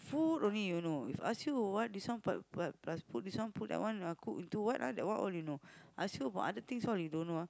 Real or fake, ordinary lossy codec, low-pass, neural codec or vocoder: real; none; none; none